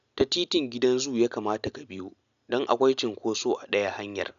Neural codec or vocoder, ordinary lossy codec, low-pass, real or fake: none; none; 7.2 kHz; real